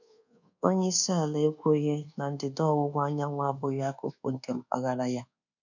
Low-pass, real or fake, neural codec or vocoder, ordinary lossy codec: 7.2 kHz; fake; codec, 24 kHz, 1.2 kbps, DualCodec; none